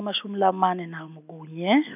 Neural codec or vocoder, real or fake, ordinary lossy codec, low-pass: none; real; none; 3.6 kHz